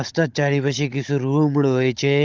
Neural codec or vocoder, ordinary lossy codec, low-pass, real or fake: codec, 16 kHz, 16 kbps, FunCodec, trained on Chinese and English, 50 frames a second; Opus, 32 kbps; 7.2 kHz; fake